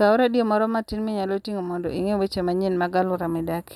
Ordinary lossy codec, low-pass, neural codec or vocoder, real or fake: none; 19.8 kHz; none; real